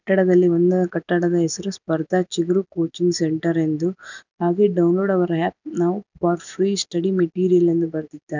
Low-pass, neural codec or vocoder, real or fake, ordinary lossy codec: 7.2 kHz; none; real; none